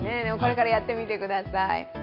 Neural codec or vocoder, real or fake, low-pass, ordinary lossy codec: none; real; 5.4 kHz; none